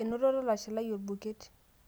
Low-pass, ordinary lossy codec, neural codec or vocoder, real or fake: none; none; none; real